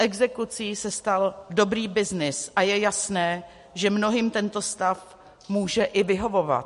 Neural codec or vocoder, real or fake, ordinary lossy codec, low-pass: none; real; MP3, 48 kbps; 14.4 kHz